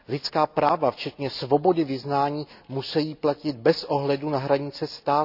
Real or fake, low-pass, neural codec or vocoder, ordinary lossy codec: real; 5.4 kHz; none; none